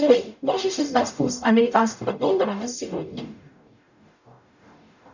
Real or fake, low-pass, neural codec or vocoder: fake; 7.2 kHz; codec, 44.1 kHz, 0.9 kbps, DAC